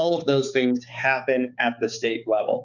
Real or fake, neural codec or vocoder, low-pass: fake; codec, 16 kHz, 4 kbps, X-Codec, HuBERT features, trained on balanced general audio; 7.2 kHz